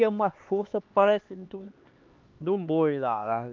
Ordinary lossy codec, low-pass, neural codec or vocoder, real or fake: Opus, 24 kbps; 7.2 kHz; codec, 16 kHz, 2 kbps, X-Codec, HuBERT features, trained on LibriSpeech; fake